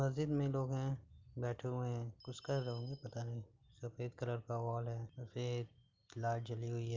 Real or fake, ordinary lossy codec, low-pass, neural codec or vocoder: real; Opus, 32 kbps; 7.2 kHz; none